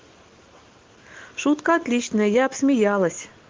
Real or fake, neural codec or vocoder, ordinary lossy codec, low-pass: real; none; Opus, 16 kbps; 7.2 kHz